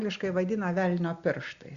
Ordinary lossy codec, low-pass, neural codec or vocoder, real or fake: Opus, 64 kbps; 7.2 kHz; none; real